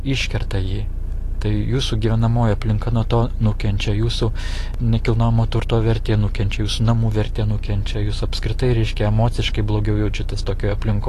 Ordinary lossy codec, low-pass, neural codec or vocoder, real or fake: AAC, 48 kbps; 14.4 kHz; none; real